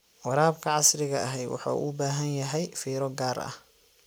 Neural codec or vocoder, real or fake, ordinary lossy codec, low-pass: none; real; none; none